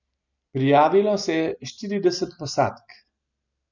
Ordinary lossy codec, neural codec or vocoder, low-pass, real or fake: none; none; 7.2 kHz; real